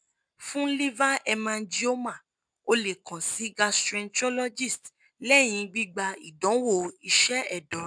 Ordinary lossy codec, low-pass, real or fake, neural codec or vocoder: none; 9.9 kHz; real; none